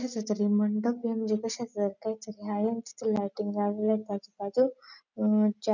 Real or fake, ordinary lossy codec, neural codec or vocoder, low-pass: real; none; none; 7.2 kHz